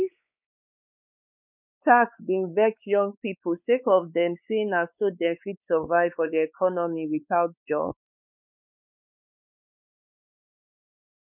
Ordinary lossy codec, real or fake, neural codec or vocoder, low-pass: none; fake; codec, 16 kHz, 4 kbps, X-Codec, WavLM features, trained on Multilingual LibriSpeech; 3.6 kHz